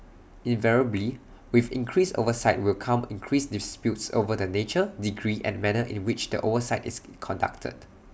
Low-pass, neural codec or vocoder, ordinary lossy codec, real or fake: none; none; none; real